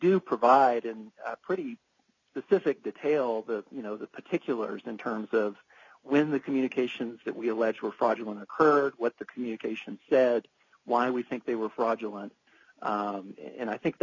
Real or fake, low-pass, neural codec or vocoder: real; 7.2 kHz; none